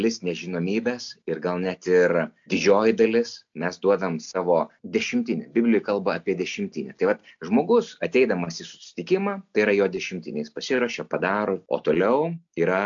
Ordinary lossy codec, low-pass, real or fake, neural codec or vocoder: AAC, 64 kbps; 7.2 kHz; real; none